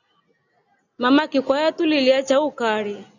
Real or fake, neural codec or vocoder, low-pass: real; none; 7.2 kHz